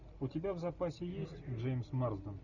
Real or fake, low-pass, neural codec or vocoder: real; 7.2 kHz; none